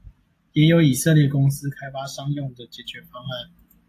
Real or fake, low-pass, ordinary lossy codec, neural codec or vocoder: real; 14.4 kHz; AAC, 64 kbps; none